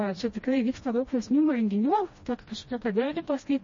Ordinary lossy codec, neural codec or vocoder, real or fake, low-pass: MP3, 32 kbps; codec, 16 kHz, 1 kbps, FreqCodec, smaller model; fake; 7.2 kHz